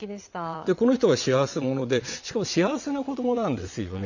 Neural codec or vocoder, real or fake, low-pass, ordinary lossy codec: vocoder, 22.05 kHz, 80 mel bands, WaveNeXt; fake; 7.2 kHz; none